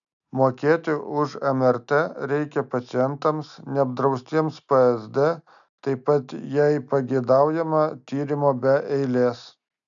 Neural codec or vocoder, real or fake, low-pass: none; real; 7.2 kHz